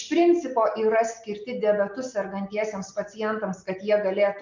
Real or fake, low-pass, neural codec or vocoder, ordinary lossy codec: real; 7.2 kHz; none; MP3, 64 kbps